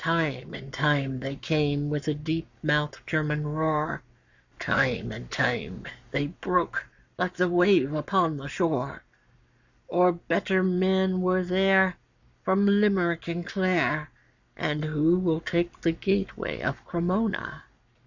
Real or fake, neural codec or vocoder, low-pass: fake; codec, 44.1 kHz, 7.8 kbps, Pupu-Codec; 7.2 kHz